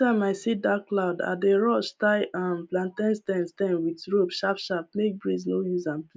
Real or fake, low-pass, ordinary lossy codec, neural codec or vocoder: real; none; none; none